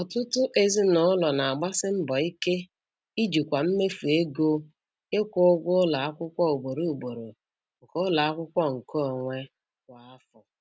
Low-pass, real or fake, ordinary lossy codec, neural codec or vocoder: none; real; none; none